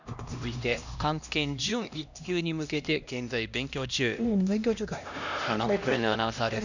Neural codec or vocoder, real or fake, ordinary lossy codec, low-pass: codec, 16 kHz, 1 kbps, X-Codec, HuBERT features, trained on LibriSpeech; fake; none; 7.2 kHz